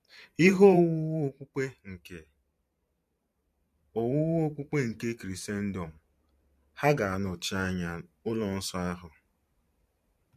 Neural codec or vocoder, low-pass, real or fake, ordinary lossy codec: vocoder, 44.1 kHz, 128 mel bands every 512 samples, BigVGAN v2; 14.4 kHz; fake; MP3, 64 kbps